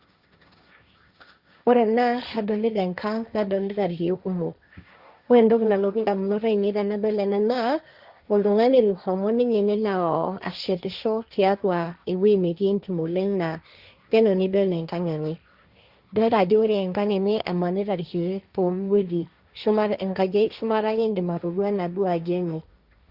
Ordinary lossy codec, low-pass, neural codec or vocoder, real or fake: Opus, 64 kbps; 5.4 kHz; codec, 16 kHz, 1.1 kbps, Voila-Tokenizer; fake